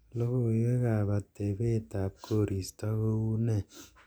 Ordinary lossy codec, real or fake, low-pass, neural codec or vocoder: none; real; none; none